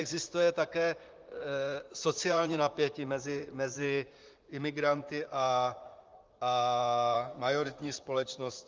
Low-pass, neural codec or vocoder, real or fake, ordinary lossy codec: 7.2 kHz; vocoder, 44.1 kHz, 128 mel bands, Pupu-Vocoder; fake; Opus, 32 kbps